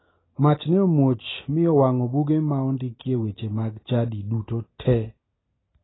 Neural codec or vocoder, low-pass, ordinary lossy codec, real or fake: none; 7.2 kHz; AAC, 16 kbps; real